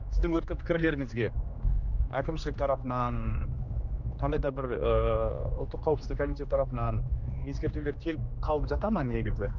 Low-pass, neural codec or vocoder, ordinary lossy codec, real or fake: 7.2 kHz; codec, 16 kHz, 2 kbps, X-Codec, HuBERT features, trained on general audio; none; fake